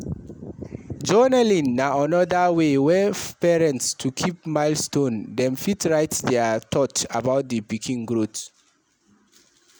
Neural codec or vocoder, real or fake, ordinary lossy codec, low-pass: none; real; none; none